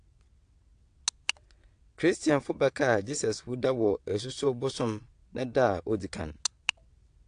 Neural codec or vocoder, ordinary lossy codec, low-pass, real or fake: vocoder, 22.05 kHz, 80 mel bands, WaveNeXt; AAC, 48 kbps; 9.9 kHz; fake